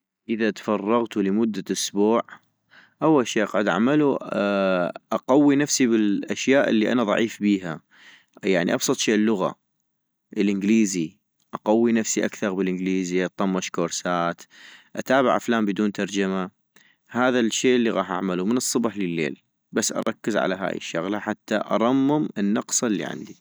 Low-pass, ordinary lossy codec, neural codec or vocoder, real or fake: none; none; none; real